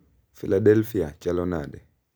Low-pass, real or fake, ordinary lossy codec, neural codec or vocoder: none; real; none; none